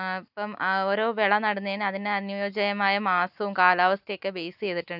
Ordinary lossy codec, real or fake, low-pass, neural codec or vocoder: none; real; 5.4 kHz; none